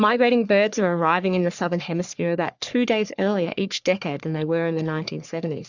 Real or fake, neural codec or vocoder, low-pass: fake; codec, 44.1 kHz, 3.4 kbps, Pupu-Codec; 7.2 kHz